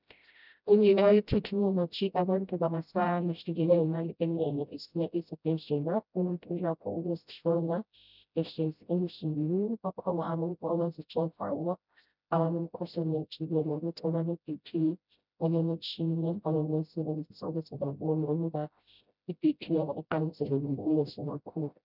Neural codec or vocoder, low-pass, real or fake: codec, 16 kHz, 0.5 kbps, FreqCodec, smaller model; 5.4 kHz; fake